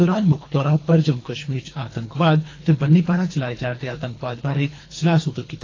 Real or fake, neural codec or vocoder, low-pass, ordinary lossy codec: fake; codec, 24 kHz, 3 kbps, HILCodec; 7.2 kHz; AAC, 32 kbps